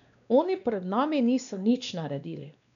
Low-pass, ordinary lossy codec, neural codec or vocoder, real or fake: 7.2 kHz; none; codec, 16 kHz, 2 kbps, X-Codec, WavLM features, trained on Multilingual LibriSpeech; fake